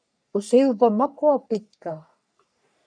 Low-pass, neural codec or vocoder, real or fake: 9.9 kHz; codec, 44.1 kHz, 3.4 kbps, Pupu-Codec; fake